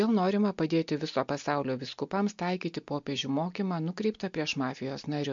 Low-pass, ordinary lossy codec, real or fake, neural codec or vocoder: 7.2 kHz; MP3, 48 kbps; real; none